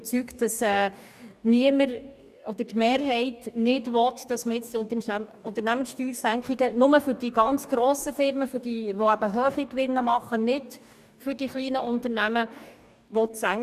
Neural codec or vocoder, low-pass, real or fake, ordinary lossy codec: codec, 44.1 kHz, 2.6 kbps, DAC; 14.4 kHz; fake; none